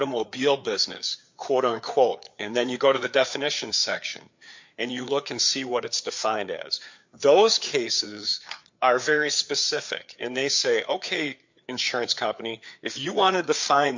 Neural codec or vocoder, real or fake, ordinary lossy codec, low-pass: codec, 16 kHz, 4 kbps, FreqCodec, larger model; fake; MP3, 48 kbps; 7.2 kHz